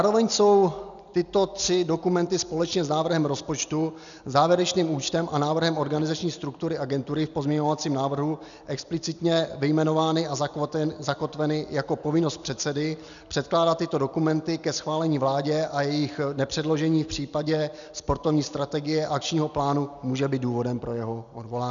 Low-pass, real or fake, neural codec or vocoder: 7.2 kHz; real; none